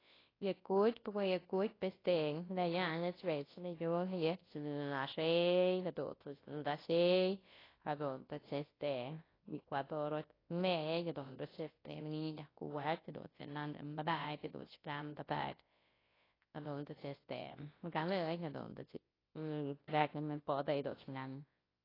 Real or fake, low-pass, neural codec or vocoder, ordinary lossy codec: fake; 5.4 kHz; codec, 24 kHz, 0.9 kbps, WavTokenizer, large speech release; AAC, 24 kbps